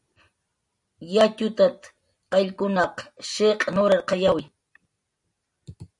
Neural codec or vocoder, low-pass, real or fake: none; 10.8 kHz; real